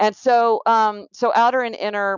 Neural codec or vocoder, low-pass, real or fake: none; 7.2 kHz; real